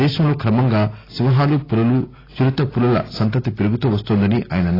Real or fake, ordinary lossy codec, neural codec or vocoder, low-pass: real; AAC, 24 kbps; none; 5.4 kHz